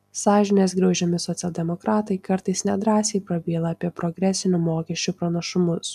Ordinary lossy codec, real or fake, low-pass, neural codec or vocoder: MP3, 96 kbps; real; 14.4 kHz; none